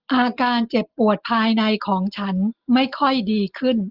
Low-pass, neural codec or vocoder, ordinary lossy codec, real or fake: 5.4 kHz; none; Opus, 24 kbps; real